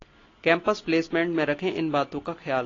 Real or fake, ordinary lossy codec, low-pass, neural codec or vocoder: real; AAC, 32 kbps; 7.2 kHz; none